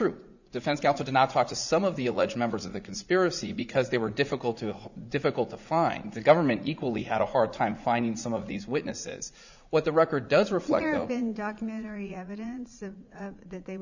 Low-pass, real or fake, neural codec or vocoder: 7.2 kHz; fake; vocoder, 44.1 kHz, 80 mel bands, Vocos